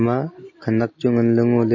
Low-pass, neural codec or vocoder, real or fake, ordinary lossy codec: 7.2 kHz; none; real; MP3, 32 kbps